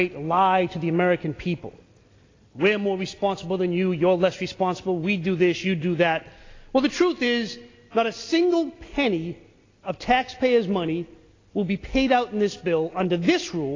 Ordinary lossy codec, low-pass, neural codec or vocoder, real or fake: AAC, 32 kbps; 7.2 kHz; none; real